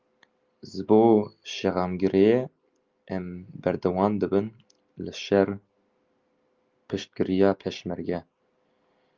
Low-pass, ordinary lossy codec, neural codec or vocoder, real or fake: 7.2 kHz; Opus, 24 kbps; none; real